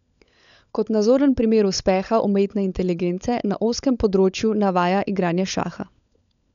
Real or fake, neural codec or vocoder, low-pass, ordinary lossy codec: fake; codec, 16 kHz, 16 kbps, FunCodec, trained on LibriTTS, 50 frames a second; 7.2 kHz; none